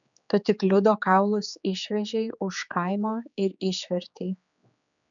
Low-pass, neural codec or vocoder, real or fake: 7.2 kHz; codec, 16 kHz, 4 kbps, X-Codec, HuBERT features, trained on general audio; fake